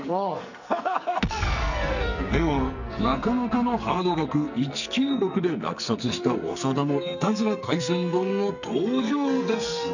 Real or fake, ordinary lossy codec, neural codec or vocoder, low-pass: fake; none; codec, 44.1 kHz, 2.6 kbps, SNAC; 7.2 kHz